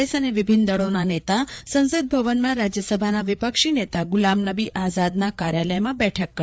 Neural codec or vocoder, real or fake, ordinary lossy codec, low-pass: codec, 16 kHz, 4 kbps, FreqCodec, larger model; fake; none; none